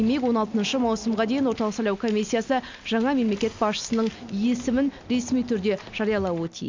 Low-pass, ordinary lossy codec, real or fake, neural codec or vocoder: 7.2 kHz; MP3, 64 kbps; real; none